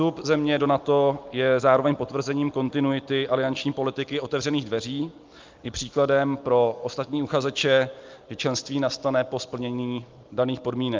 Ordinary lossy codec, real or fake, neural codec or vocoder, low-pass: Opus, 24 kbps; real; none; 7.2 kHz